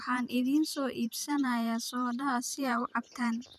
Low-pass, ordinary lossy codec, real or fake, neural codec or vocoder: 14.4 kHz; none; fake; vocoder, 44.1 kHz, 128 mel bands every 512 samples, BigVGAN v2